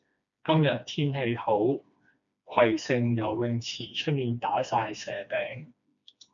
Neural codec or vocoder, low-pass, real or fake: codec, 16 kHz, 2 kbps, FreqCodec, smaller model; 7.2 kHz; fake